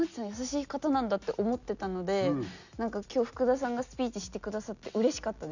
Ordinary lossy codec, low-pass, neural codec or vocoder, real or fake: none; 7.2 kHz; none; real